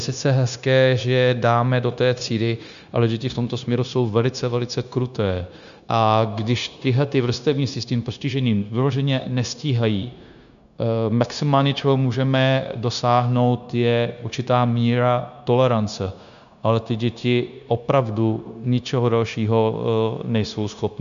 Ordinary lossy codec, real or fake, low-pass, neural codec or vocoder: MP3, 96 kbps; fake; 7.2 kHz; codec, 16 kHz, 0.9 kbps, LongCat-Audio-Codec